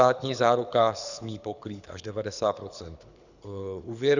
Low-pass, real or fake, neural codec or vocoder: 7.2 kHz; fake; codec, 24 kHz, 6 kbps, HILCodec